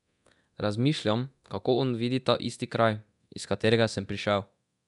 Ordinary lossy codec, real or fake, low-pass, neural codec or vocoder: none; fake; 10.8 kHz; codec, 24 kHz, 0.9 kbps, DualCodec